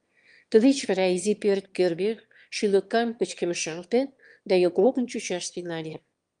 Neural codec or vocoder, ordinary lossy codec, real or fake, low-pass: autoencoder, 22.05 kHz, a latent of 192 numbers a frame, VITS, trained on one speaker; Opus, 32 kbps; fake; 9.9 kHz